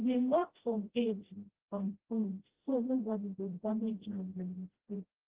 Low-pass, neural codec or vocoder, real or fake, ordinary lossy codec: 3.6 kHz; codec, 16 kHz, 0.5 kbps, FreqCodec, smaller model; fake; Opus, 16 kbps